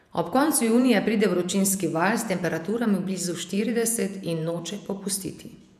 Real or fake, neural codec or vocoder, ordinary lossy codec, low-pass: fake; vocoder, 48 kHz, 128 mel bands, Vocos; none; 14.4 kHz